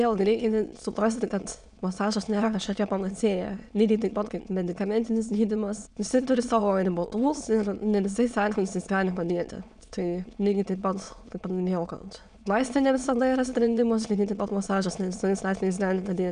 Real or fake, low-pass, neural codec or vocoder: fake; 9.9 kHz; autoencoder, 22.05 kHz, a latent of 192 numbers a frame, VITS, trained on many speakers